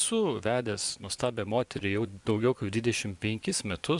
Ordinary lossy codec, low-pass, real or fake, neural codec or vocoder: MP3, 96 kbps; 10.8 kHz; fake; vocoder, 24 kHz, 100 mel bands, Vocos